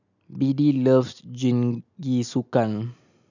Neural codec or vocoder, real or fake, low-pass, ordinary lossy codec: none; real; 7.2 kHz; none